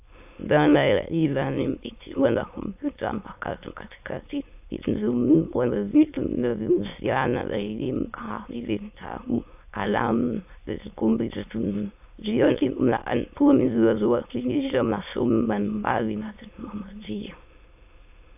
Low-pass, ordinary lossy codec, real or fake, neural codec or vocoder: 3.6 kHz; AAC, 32 kbps; fake; autoencoder, 22.05 kHz, a latent of 192 numbers a frame, VITS, trained on many speakers